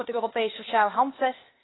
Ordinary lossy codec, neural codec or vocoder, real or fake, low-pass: AAC, 16 kbps; codec, 16 kHz, 0.8 kbps, ZipCodec; fake; 7.2 kHz